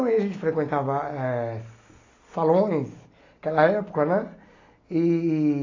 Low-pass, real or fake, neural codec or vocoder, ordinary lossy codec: 7.2 kHz; real; none; AAC, 32 kbps